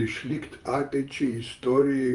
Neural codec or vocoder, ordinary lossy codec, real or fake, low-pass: vocoder, 24 kHz, 100 mel bands, Vocos; Opus, 32 kbps; fake; 10.8 kHz